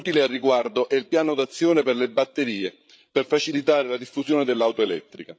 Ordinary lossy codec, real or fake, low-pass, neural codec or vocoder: none; fake; none; codec, 16 kHz, 8 kbps, FreqCodec, larger model